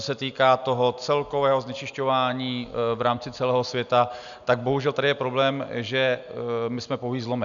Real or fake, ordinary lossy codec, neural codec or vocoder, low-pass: real; MP3, 96 kbps; none; 7.2 kHz